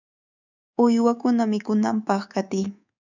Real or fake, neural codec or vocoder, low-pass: fake; codec, 24 kHz, 3.1 kbps, DualCodec; 7.2 kHz